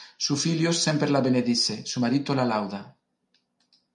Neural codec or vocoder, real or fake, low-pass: none; real; 10.8 kHz